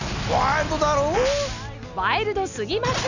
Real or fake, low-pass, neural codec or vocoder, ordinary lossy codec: real; 7.2 kHz; none; none